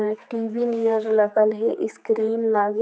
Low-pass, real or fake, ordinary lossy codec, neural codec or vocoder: none; fake; none; codec, 16 kHz, 4 kbps, X-Codec, HuBERT features, trained on general audio